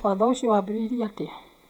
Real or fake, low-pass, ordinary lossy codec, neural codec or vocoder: fake; 19.8 kHz; none; vocoder, 48 kHz, 128 mel bands, Vocos